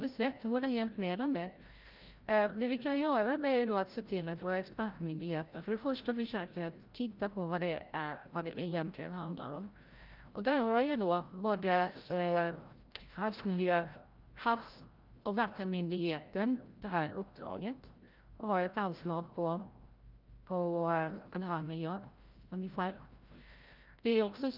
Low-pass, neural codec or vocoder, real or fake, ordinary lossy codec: 5.4 kHz; codec, 16 kHz, 0.5 kbps, FreqCodec, larger model; fake; Opus, 32 kbps